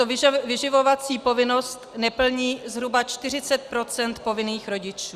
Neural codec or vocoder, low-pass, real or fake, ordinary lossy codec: none; 14.4 kHz; real; Opus, 64 kbps